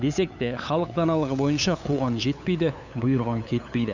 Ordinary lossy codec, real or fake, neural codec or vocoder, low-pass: none; fake; codec, 16 kHz, 8 kbps, FunCodec, trained on LibriTTS, 25 frames a second; 7.2 kHz